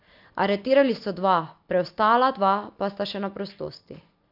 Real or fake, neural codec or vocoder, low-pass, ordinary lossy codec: real; none; 5.4 kHz; MP3, 48 kbps